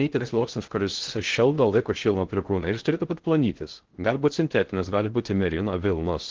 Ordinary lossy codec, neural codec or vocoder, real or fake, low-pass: Opus, 16 kbps; codec, 16 kHz in and 24 kHz out, 0.6 kbps, FocalCodec, streaming, 2048 codes; fake; 7.2 kHz